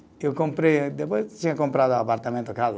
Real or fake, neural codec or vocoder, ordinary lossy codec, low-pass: real; none; none; none